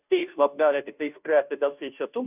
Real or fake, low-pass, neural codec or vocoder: fake; 3.6 kHz; codec, 16 kHz, 0.5 kbps, FunCodec, trained on Chinese and English, 25 frames a second